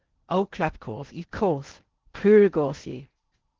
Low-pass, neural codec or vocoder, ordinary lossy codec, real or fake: 7.2 kHz; codec, 16 kHz in and 24 kHz out, 0.8 kbps, FocalCodec, streaming, 65536 codes; Opus, 16 kbps; fake